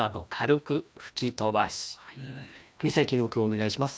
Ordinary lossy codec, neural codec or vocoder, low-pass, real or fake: none; codec, 16 kHz, 1 kbps, FreqCodec, larger model; none; fake